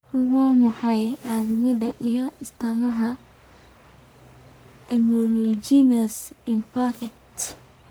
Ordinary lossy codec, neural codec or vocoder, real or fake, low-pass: none; codec, 44.1 kHz, 1.7 kbps, Pupu-Codec; fake; none